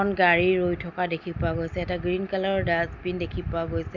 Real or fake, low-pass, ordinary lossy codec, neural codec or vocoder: real; 7.2 kHz; none; none